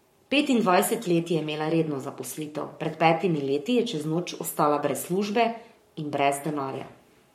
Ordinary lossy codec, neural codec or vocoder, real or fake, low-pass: MP3, 64 kbps; codec, 44.1 kHz, 7.8 kbps, Pupu-Codec; fake; 19.8 kHz